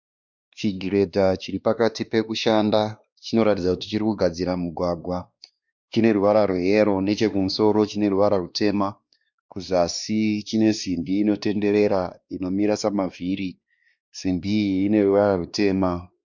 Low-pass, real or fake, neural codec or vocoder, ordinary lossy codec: 7.2 kHz; fake; codec, 16 kHz, 2 kbps, X-Codec, WavLM features, trained on Multilingual LibriSpeech; Opus, 64 kbps